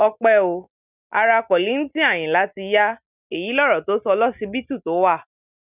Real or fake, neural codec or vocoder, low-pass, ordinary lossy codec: real; none; 3.6 kHz; none